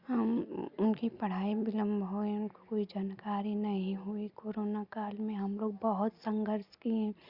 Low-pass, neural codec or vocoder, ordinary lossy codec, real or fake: 5.4 kHz; none; Opus, 64 kbps; real